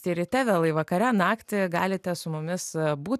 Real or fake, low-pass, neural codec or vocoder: real; 14.4 kHz; none